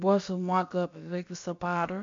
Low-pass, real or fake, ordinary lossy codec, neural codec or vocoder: 7.2 kHz; fake; MP3, 48 kbps; codec, 16 kHz, about 1 kbps, DyCAST, with the encoder's durations